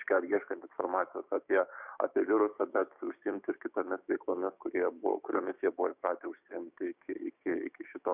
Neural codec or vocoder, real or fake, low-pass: codec, 16 kHz, 6 kbps, DAC; fake; 3.6 kHz